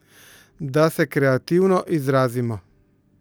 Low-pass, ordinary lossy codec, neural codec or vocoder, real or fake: none; none; none; real